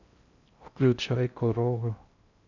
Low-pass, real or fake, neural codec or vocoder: 7.2 kHz; fake; codec, 16 kHz in and 24 kHz out, 0.6 kbps, FocalCodec, streaming, 4096 codes